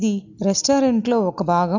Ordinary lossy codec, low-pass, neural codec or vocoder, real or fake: none; 7.2 kHz; none; real